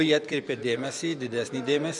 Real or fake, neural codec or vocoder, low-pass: fake; vocoder, 24 kHz, 100 mel bands, Vocos; 10.8 kHz